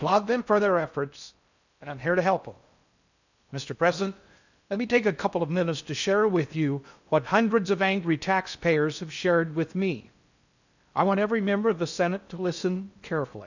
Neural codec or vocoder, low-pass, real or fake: codec, 16 kHz in and 24 kHz out, 0.6 kbps, FocalCodec, streaming, 4096 codes; 7.2 kHz; fake